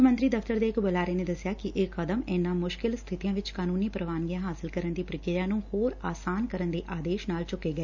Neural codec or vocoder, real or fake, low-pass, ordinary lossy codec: none; real; 7.2 kHz; none